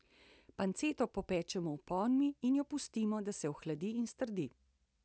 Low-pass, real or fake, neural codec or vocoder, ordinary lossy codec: none; real; none; none